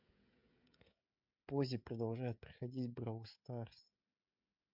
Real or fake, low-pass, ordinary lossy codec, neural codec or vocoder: fake; 5.4 kHz; MP3, 48 kbps; codec, 16 kHz, 16 kbps, FreqCodec, smaller model